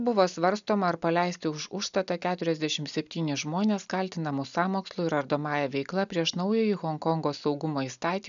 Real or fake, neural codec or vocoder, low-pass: real; none; 7.2 kHz